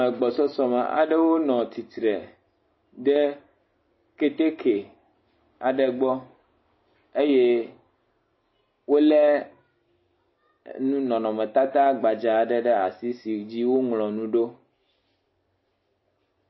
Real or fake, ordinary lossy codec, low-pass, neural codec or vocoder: real; MP3, 24 kbps; 7.2 kHz; none